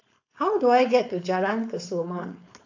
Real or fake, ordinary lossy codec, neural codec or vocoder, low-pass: fake; AAC, 48 kbps; codec, 16 kHz, 4.8 kbps, FACodec; 7.2 kHz